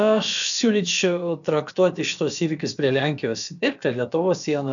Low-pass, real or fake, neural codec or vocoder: 7.2 kHz; fake; codec, 16 kHz, about 1 kbps, DyCAST, with the encoder's durations